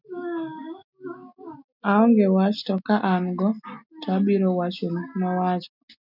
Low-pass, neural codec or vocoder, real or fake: 5.4 kHz; none; real